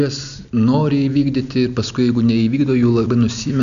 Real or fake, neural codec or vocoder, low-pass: real; none; 7.2 kHz